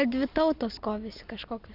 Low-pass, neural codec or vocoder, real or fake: 5.4 kHz; none; real